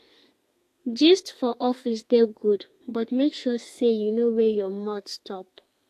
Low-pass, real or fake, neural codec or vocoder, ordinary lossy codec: 14.4 kHz; fake; codec, 32 kHz, 1.9 kbps, SNAC; AAC, 64 kbps